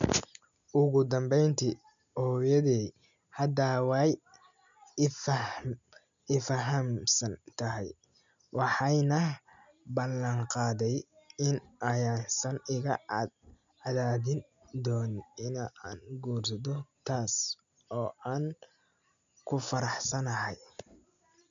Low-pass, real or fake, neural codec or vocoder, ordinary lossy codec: 7.2 kHz; real; none; MP3, 96 kbps